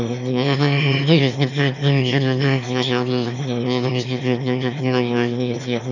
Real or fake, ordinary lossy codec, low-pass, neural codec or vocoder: fake; none; 7.2 kHz; autoencoder, 22.05 kHz, a latent of 192 numbers a frame, VITS, trained on one speaker